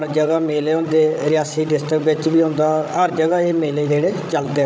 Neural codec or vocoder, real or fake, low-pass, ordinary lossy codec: codec, 16 kHz, 16 kbps, FreqCodec, larger model; fake; none; none